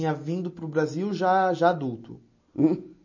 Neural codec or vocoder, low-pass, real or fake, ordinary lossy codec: none; 7.2 kHz; real; MP3, 32 kbps